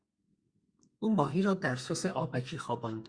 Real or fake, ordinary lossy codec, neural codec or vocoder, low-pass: fake; Opus, 64 kbps; codec, 32 kHz, 1.9 kbps, SNAC; 9.9 kHz